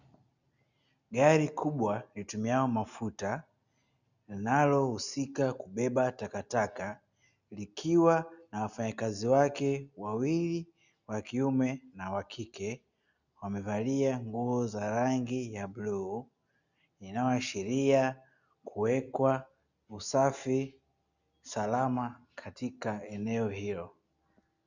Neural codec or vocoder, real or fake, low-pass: none; real; 7.2 kHz